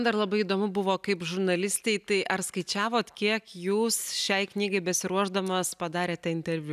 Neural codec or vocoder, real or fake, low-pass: none; real; 14.4 kHz